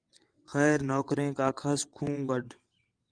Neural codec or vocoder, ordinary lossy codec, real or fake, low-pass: vocoder, 22.05 kHz, 80 mel bands, WaveNeXt; Opus, 32 kbps; fake; 9.9 kHz